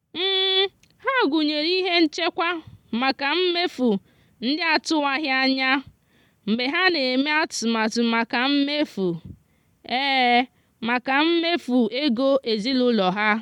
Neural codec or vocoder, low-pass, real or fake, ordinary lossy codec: none; 19.8 kHz; real; MP3, 96 kbps